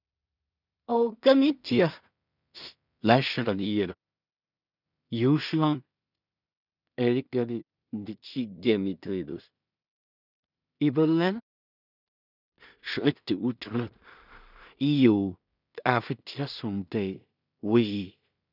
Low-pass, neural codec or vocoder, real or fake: 5.4 kHz; codec, 16 kHz in and 24 kHz out, 0.4 kbps, LongCat-Audio-Codec, two codebook decoder; fake